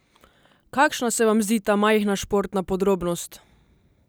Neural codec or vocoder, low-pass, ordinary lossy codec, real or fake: none; none; none; real